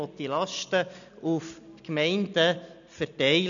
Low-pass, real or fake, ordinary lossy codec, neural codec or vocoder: 7.2 kHz; real; none; none